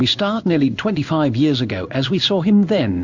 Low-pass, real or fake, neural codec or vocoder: 7.2 kHz; fake; codec, 16 kHz in and 24 kHz out, 1 kbps, XY-Tokenizer